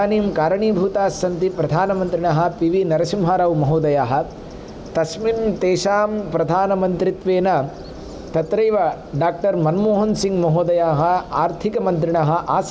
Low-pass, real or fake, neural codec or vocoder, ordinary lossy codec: none; real; none; none